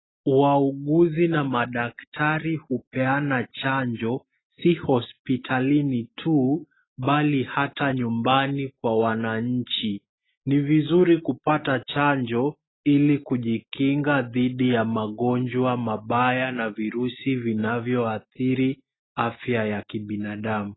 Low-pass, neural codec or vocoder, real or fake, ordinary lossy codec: 7.2 kHz; none; real; AAC, 16 kbps